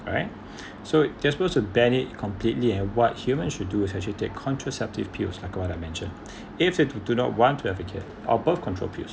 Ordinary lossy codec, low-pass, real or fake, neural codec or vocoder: none; none; real; none